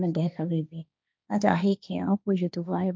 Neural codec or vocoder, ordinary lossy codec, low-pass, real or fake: codec, 16 kHz, 1.1 kbps, Voila-Tokenizer; none; none; fake